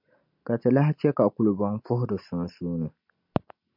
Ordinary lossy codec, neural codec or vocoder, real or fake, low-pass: MP3, 48 kbps; none; real; 5.4 kHz